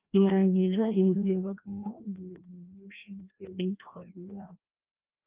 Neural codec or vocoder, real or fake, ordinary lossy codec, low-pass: codec, 16 kHz, 1 kbps, FreqCodec, larger model; fake; Opus, 24 kbps; 3.6 kHz